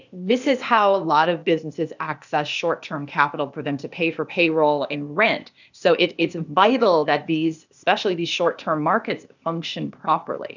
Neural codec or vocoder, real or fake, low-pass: codec, 16 kHz, 0.8 kbps, ZipCodec; fake; 7.2 kHz